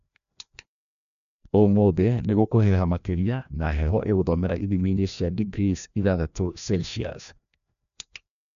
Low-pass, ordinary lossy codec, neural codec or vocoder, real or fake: 7.2 kHz; none; codec, 16 kHz, 1 kbps, FreqCodec, larger model; fake